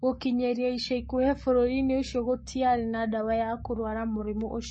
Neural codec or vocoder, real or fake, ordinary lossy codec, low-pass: codec, 44.1 kHz, 7.8 kbps, Pupu-Codec; fake; MP3, 32 kbps; 10.8 kHz